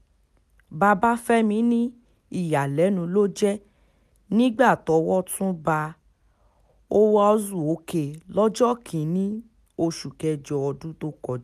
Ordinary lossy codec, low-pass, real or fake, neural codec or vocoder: none; 14.4 kHz; real; none